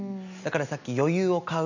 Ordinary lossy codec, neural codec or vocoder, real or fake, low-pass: none; none; real; 7.2 kHz